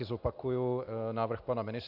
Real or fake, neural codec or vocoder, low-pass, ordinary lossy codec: real; none; 5.4 kHz; AAC, 48 kbps